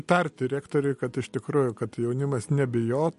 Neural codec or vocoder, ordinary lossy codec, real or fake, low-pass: none; MP3, 48 kbps; real; 14.4 kHz